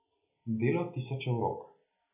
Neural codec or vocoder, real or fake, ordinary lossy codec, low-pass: none; real; none; 3.6 kHz